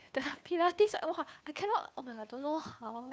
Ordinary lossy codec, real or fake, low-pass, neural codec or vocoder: none; fake; none; codec, 16 kHz, 2 kbps, FunCodec, trained on Chinese and English, 25 frames a second